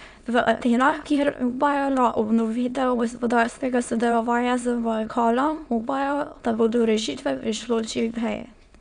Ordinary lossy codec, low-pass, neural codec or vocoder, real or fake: none; 9.9 kHz; autoencoder, 22.05 kHz, a latent of 192 numbers a frame, VITS, trained on many speakers; fake